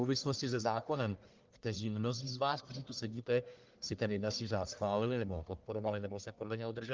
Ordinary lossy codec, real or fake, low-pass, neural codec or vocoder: Opus, 24 kbps; fake; 7.2 kHz; codec, 44.1 kHz, 1.7 kbps, Pupu-Codec